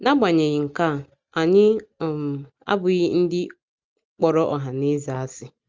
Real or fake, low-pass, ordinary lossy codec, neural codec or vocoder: real; 7.2 kHz; Opus, 32 kbps; none